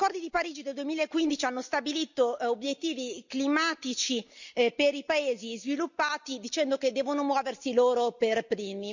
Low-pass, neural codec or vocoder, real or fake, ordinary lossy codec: 7.2 kHz; none; real; none